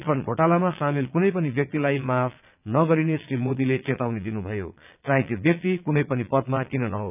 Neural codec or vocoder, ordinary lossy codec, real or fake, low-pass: vocoder, 22.05 kHz, 80 mel bands, Vocos; none; fake; 3.6 kHz